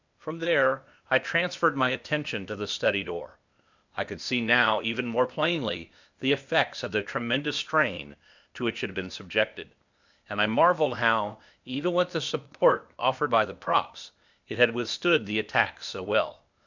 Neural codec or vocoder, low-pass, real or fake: codec, 16 kHz, 0.8 kbps, ZipCodec; 7.2 kHz; fake